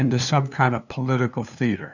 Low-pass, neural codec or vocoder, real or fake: 7.2 kHz; codec, 16 kHz, 2 kbps, FunCodec, trained on LibriTTS, 25 frames a second; fake